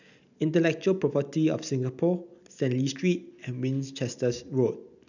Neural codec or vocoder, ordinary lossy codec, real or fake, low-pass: none; none; real; 7.2 kHz